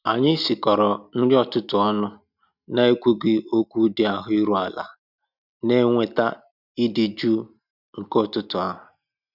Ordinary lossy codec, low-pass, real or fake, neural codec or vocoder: none; 5.4 kHz; real; none